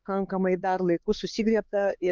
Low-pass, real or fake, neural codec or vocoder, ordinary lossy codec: 7.2 kHz; fake; codec, 16 kHz, 4 kbps, X-Codec, HuBERT features, trained on LibriSpeech; Opus, 32 kbps